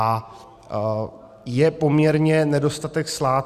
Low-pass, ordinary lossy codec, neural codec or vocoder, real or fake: 14.4 kHz; Opus, 64 kbps; none; real